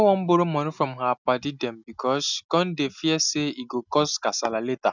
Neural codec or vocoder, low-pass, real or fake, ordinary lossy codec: none; 7.2 kHz; real; none